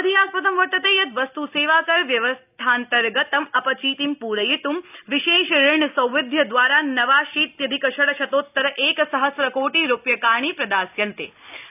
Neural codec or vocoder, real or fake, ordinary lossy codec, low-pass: none; real; none; 3.6 kHz